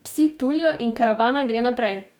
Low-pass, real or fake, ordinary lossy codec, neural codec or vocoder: none; fake; none; codec, 44.1 kHz, 2.6 kbps, DAC